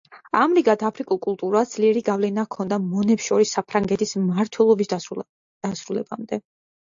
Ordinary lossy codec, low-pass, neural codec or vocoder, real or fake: AAC, 64 kbps; 7.2 kHz; none; real